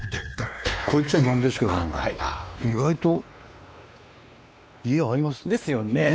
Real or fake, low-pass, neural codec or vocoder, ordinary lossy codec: fake; none; codec, 16 kHz, 2 kbps, X-Codec, WavLM features, trained on Multilingual LibriSpeech; none